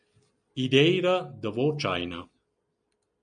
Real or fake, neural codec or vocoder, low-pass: real; none; 9.9 kHz